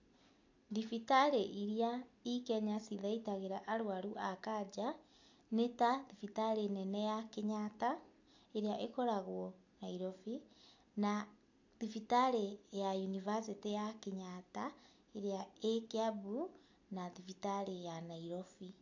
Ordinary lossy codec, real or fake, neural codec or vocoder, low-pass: AAC, 48 kbps; real; none; 7.2 kHz